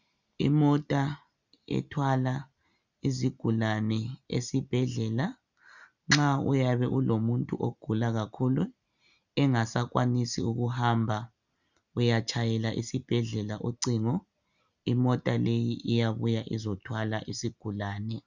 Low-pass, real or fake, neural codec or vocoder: 7.2 kHz; real; none